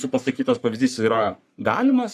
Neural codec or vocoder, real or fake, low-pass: codec, 44.1 kHz, 3.4 kbps, Pupu-Codec; fake; 14.4 kHz